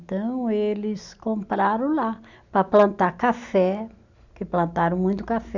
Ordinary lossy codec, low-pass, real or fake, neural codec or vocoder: none; 7.2 kHz; real; none